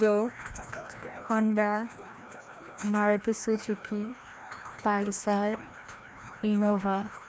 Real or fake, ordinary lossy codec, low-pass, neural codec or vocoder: fake; none; none; codec, 16 kHz, 1 kbps, FreqCodec, larger model